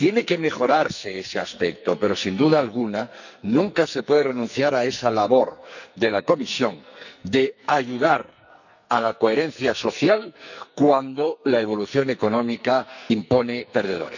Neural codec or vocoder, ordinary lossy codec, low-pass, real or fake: codec, 44.1 kHz, 2.6 kbps, SNAC; none; 7.2 kHz; fake